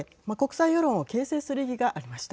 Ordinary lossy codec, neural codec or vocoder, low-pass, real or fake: none; none; none; real